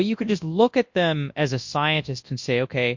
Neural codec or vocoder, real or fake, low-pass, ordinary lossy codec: codec, 24 kHz, 0.9 kbps, WavTokenizer, large speech release; fake; 7.2 kHz; MP3, 48 kbps